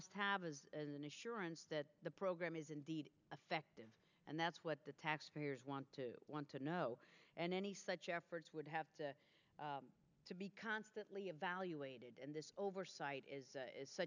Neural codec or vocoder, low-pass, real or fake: none; 7.2 kHz; real